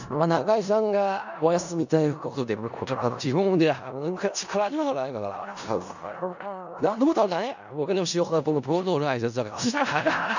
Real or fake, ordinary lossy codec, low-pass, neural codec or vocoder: fake; none; 7.2 kHz; codec, 16 kHz in and 24 kHz out, 0.4 kbps, LongCat-Audio-Codec, four codebook decoder